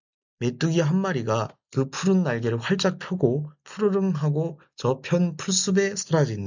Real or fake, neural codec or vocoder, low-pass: real; none; 7.2 kHz